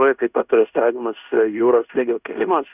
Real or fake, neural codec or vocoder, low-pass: fake; codec, 16 kHz in and 24 kHz out, 0.9 kbps, LongCat-Audio-Codec, fine tuned four codebook decoder; 3.6 kHz